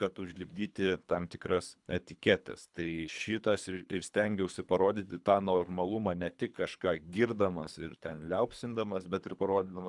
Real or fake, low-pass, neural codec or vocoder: fake; 10.8 kHz; codec, 24 kHz, 3 kbps, HILCodec